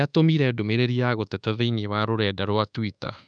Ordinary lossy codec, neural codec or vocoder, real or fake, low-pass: none; codec, 24 kHz, 1.2 kbps, DualCodec; fake; 9.9 kHz